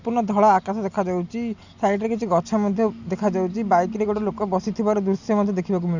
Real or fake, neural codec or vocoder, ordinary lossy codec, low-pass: real; none; none; 7.2 kHz